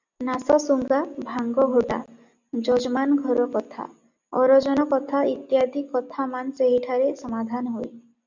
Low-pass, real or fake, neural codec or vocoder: 7.2 kHz; real; none